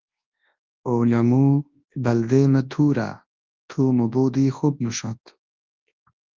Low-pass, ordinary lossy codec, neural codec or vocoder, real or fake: 7.2 kHz; Opus, 32 kbps; codec, 24 kHz, 0.9 kbps, WavTokenizer, large speech release; fake